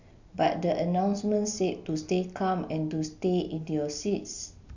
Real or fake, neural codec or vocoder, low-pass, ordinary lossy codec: real; none; 7.2 kHz; none